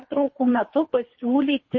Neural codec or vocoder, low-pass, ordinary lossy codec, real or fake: codec, 24 kHz, 3 kbps, HILCodec; 7.2 kHz; MP3, 32 kbps; fake